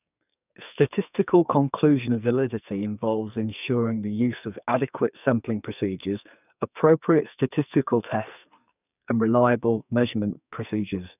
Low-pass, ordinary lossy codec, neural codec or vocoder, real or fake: 3.6 kHz; none; codec, 44.1 kHz, 2.6 kbps, SNAC; fake